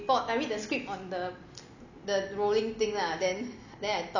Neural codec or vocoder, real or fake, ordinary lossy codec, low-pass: none; real; none; 7.2 kHz